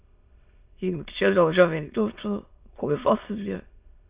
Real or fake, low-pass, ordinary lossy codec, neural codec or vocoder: fake; 3.6 kHz; Opus, 64 kbps; autoencoder, 22.05 kHz, a latent of 192 numbers a frame, VITS, trained on many speakers